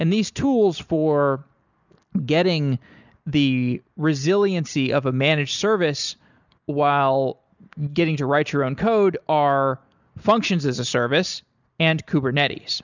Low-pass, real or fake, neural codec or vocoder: 7.2 kHz; real; none